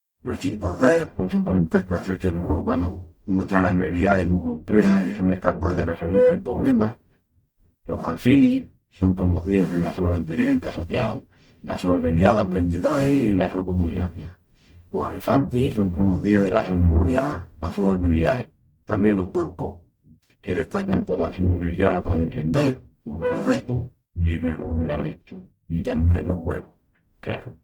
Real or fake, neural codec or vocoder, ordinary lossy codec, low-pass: fake; codec, 44.1 kHz, 0.9 kbps, DAC; Opus, 64 kbps; 19.8 kHz